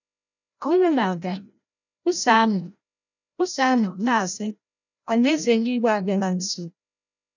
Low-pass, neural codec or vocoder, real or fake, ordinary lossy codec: 7.2 kHz; codec, 16 kHz, 0.5 kbps, FreqCodec, larger model; fake; none